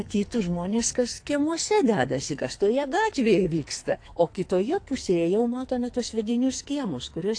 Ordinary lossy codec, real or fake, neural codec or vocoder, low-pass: AAC, 48 kbps; fake; codec, 44.1 kHz, 2.6 kbps, SNAC; 9.9 kHz